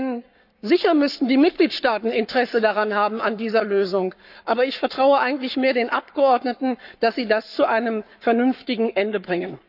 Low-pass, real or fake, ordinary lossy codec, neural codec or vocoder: 5.4 kHz; fake; none; codec, 44.1 kHz, 7.8 kbps, Pupu-Codec